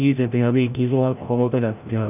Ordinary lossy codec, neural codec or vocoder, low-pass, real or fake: none; codec, 16 kHz, 0.5 kbps, FreqCodec, larger model; 3.6 kHz; fake